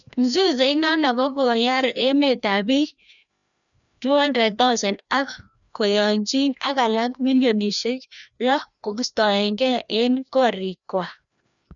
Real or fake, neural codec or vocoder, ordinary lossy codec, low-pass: fake; codec, 16 kHz, 1 kbps, FreqCodec, larger model; none; 7.2 kHz